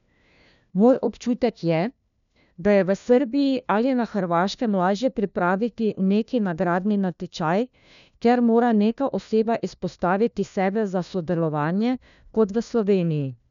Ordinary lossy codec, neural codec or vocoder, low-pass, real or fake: none; codec, 16 kHz, 1 kbps, FunCodec, trained on LibriTTS, 50 frames a second; 7.2 kHz; fake